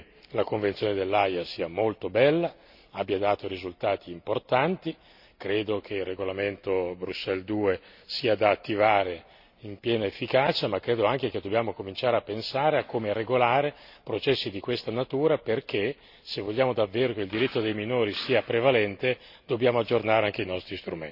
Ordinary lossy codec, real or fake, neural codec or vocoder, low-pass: none; real; none; 5.4 kHz